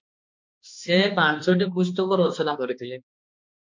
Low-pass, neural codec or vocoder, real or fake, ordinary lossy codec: 7.2 kHz; codec, 16 kHz, 2 kbps, X-Codec, HuBERT features, trained on balanced general audio; fake; MP3, 48 kbps